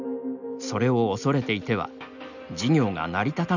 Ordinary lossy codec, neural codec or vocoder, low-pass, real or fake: none; none; 7.2 kHz; real